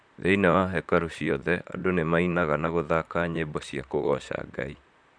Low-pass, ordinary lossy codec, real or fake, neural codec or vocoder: 9.9 kHz; none; fake; vocoder, 44.1 kHz, 128 mel bands, Pupu-Vocoder